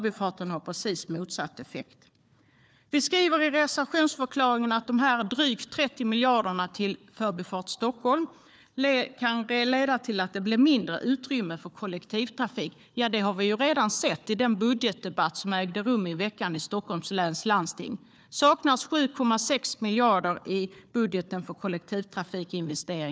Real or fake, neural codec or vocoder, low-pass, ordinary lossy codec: fake; codec, 16 kHz, 4 kbps, FunCodec, trained on Chinese and English, 50 frames a second; none; none